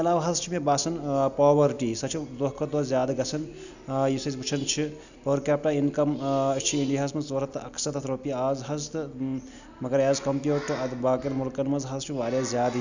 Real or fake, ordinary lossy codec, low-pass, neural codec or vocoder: real; none; 7.2 kHz; none